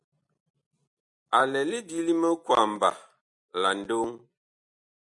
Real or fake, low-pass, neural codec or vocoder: real; 10.8 kHz; none